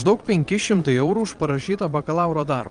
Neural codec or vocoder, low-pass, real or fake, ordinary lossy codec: vocoder, 22.05 kHz, 80 mel bands, Vocos; 9.9 kHz; fake; Opus, 32 kbps